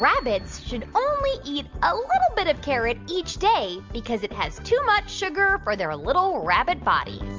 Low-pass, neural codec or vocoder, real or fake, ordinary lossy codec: 7.2 kHz; none; real; Opus, 32 kbps